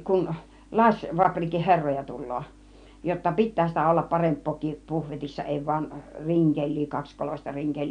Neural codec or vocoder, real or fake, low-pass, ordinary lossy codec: none; real; 9.9 kHz; none